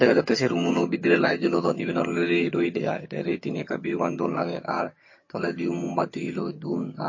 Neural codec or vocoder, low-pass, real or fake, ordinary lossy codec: vocoder, 22.05 kHz, 80 mel bands, HiFi-GAN; 7.2 kHz; fake; MP3, 32 kbps